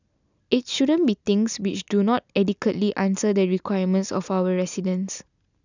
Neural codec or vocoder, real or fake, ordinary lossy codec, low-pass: none; real; none; 7.2 kHz